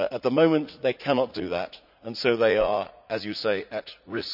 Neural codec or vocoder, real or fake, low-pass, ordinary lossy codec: vocoder, 44.1 kHz, 80 mel bands, Vocos; fake; 5.4 kHz; none